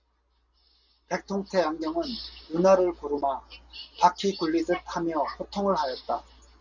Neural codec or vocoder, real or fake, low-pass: none; real; 7.2 kHz